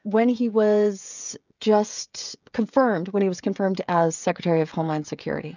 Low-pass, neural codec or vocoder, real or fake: 7.2 kHz; codec, 16 kHz, 16 kbps, FreqCodec, smaller model; fake